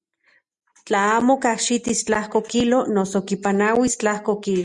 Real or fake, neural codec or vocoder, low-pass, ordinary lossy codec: real; none; 10.8 kHz; Opus, 64 kbps